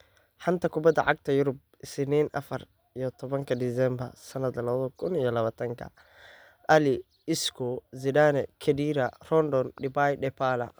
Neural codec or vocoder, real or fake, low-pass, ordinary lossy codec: none; real; none; none